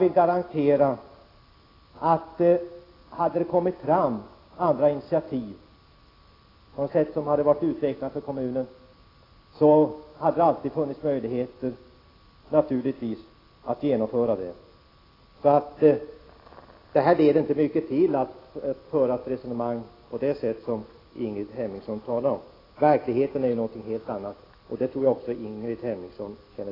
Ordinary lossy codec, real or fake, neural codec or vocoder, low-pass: AAC, 24 kbps; real; none; 5.4 kHz